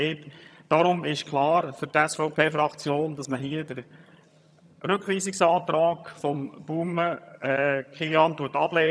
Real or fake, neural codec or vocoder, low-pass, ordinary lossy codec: fake; vocoder, 22.05 kHz, 80 mel bands, HiFi-GAN; none; none